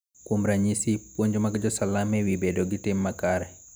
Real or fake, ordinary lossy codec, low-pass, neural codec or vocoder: real; none; none; none